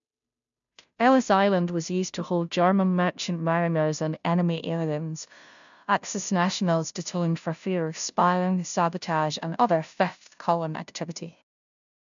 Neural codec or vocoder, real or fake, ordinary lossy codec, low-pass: codec, 16 kHz, 0.5 kbps, FunCodec, trained on Chinese and English, 25 frames a second; fake; MP3, 96 kbps; 7.2 kHz